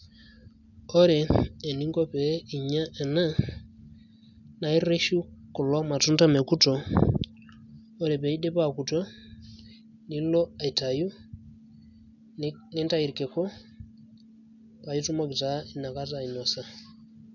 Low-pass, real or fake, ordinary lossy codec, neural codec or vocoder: 7.2 kHz; real; none; none